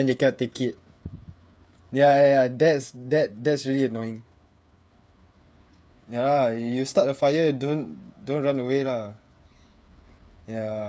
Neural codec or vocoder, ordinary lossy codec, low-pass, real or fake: codec, 16 kHz, 8 kbps, FreqCodec, smaller model; none; none; fake